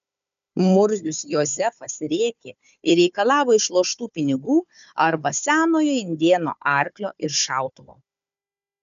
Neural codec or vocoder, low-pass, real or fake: codec, 16 kHz, 4 kbps, FunCodec, trained on Chinese and English, 50 frames a second; 7.2 kHz; fake